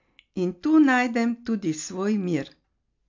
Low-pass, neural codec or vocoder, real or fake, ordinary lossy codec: 7.2 kHz; none; real; MP3, 48 kbps